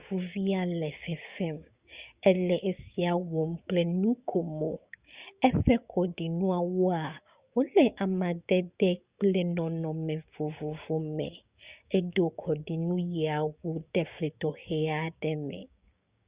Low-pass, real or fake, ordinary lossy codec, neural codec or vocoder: 3.6 kHz; fake; Opus, 64 kbps; codec, 16 kHz, 6 kbps, DAC